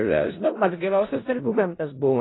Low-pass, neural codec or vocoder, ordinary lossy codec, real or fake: 7.2 kHz; codec, 16 kHz in and 24 kHz out, 0.4 kbps, LongCat-Audio-Codec, four codebook decoder; AAC, 16 kbps; fake